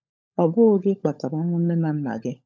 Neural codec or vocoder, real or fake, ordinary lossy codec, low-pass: codec, 16 kHz, 16 kbps, FunCodec, trained on LibriTTS, 50 frames a second; fake; none; none